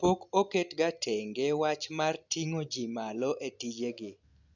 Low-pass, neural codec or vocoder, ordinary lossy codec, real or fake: 7.2 kHz; none; none; real